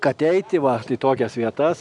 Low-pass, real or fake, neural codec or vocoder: 10.8 kHz; real; none